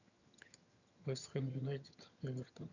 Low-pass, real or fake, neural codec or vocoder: 7.2 kHz; fake; vocoder, 22.05 kHz, 80 mel bands, HiFi-GAN